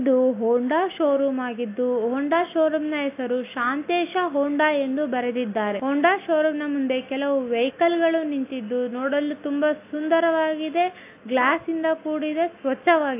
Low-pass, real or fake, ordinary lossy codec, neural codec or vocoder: 3.6 kHz; real; AAC, 24 kbps; none